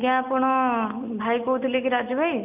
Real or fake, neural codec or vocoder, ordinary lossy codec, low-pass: real; none; none; 3.6 kHz